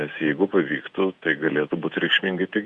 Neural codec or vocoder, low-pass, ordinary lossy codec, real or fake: vocoder, 48 kHz, 128 mel bands, Vocos; 10.8 kHz; Opus, 64 kbps; fake